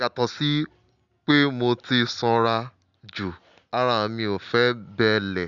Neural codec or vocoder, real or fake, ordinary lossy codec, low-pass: none; real; none; 7.2 kHz